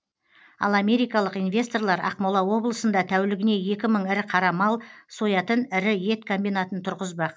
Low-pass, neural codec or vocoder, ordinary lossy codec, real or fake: none; none; none; real